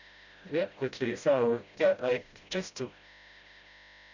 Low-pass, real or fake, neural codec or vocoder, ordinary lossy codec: 7.2 kHz; fake; codec, 16 kHz, 0.5 kbps, FreqCodec, smaller model; none